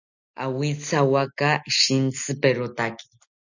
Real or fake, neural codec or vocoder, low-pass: real; none; 7.2 kHz